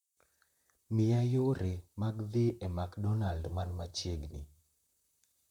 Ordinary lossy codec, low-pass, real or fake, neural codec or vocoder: none; 19.8 kHz; fake; vocoder, 44.1 kHz, 128 mel bands, Pupu-Vocoder